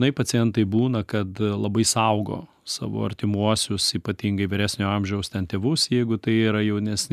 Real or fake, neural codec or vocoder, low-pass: real; none; 14.4 kHz